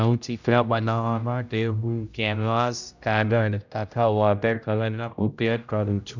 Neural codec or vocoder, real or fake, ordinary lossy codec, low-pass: codec, 16 kHz, 0.5 kbps, X-Codec, HuBERT features, trained on general audio; fake; none; 7.2 kHz